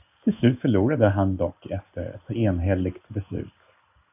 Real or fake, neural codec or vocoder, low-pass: fake; codec, 16 kHz in and 24 kHz out, 1 kbps, XY-Tokenizer; 3.6 kHz